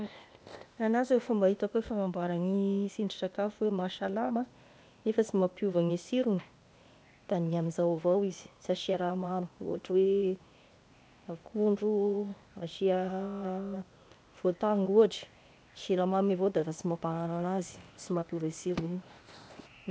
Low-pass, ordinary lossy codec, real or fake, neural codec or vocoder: none; none; fake; codec, 16 kHz, 0.8 kbps, ZipCodec